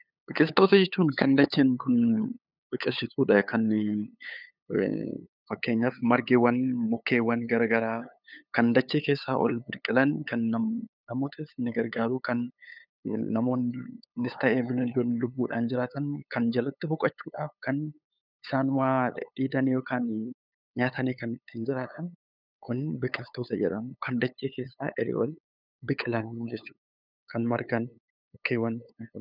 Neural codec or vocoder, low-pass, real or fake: codec, 16 kHz, 8 kbps, FunCodec, trained on LibriTTS, 25 frames a second; 5.4 kHz; fake